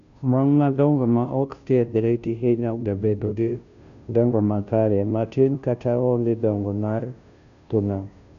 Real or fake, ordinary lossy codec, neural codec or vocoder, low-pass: fake; none; codec, 16 kHz, 0.5 kbps, FunCodec, trained on Chinese and English, 25 frames a second; 7.2 kHz